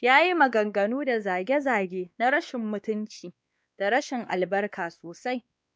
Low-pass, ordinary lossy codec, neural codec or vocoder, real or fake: none; none; codec, 16 kHz, 2 kbps, X-Codec, WavLM features, trained on Multilingual LibriSpeech; fake